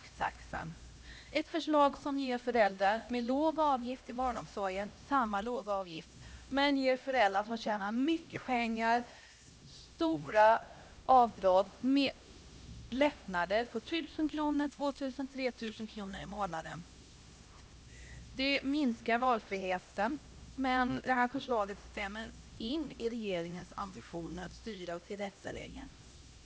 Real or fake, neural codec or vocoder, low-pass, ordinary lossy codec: fake; codec, 16 kHz, 1 kbps, X-Codec, HuBERT features, trained on LibriSpeech; none; none